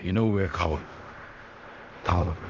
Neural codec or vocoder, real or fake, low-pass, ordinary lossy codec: codec, 16 kHz in and 24 kHz out, 0.4 kbps, LongCat-Audio-Codec, fine tuned four codebook decoder; fake; 7.2 kHz; Opus, 32 kbps